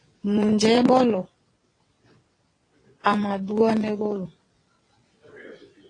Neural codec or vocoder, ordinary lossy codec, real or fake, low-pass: vocoder, 22.05 kHz, 80 mel bands, WaveNeXt; AAC, 32 kbps; fake; 9.9 kHz